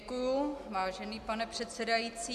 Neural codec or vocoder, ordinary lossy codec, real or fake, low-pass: none; MP3, 96 kbps; real; 14.4 kHz